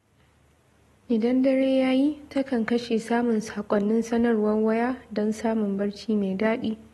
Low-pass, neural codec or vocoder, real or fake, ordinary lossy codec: 19.8 kHz; none; real; AAC, 32 kbps